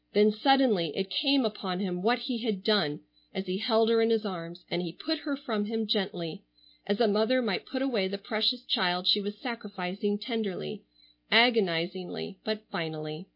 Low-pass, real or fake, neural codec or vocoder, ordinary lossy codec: 5.4 kHz; real; none; MP3, 32 kbps